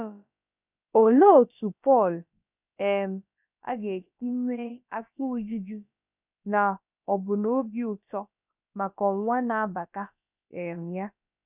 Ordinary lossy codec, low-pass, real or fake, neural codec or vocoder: none; 3.6 kHz; fake; codec, 16 kHz, about 1 kbps, DyCAST, with the encoder's durations